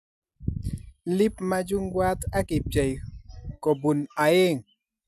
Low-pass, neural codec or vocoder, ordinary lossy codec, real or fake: 14.4 kHz; none; none; real